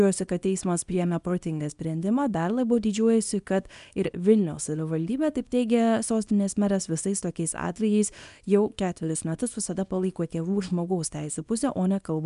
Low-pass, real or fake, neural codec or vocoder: 10.8 kHz; fake; codec, 24 kHz, 0.9 kbps, WavTokenizer, small release